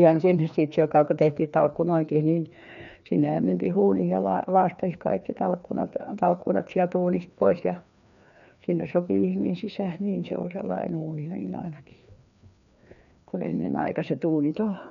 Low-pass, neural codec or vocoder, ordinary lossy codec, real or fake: 7.2 kHz; codec, 16 kHz, 2 kbps, FreqCodec, larger model; none; fake